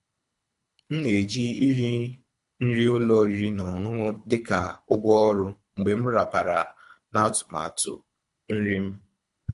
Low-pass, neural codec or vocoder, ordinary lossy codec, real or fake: 10.8 kHz; codec, 24 kHz, 3 kbps, HILCodec; AAC, 64 kbps; fake